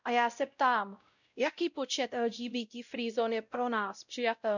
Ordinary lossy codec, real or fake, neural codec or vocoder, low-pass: none; fake; codec, 16 kHz, 0.5 kbps, X-Codec, WavLM features, trained on Multilingual LibriSpeech; 7.2 kHz